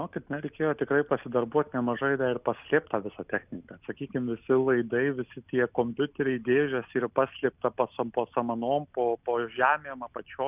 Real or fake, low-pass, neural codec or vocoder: real; 3.6 kHz; none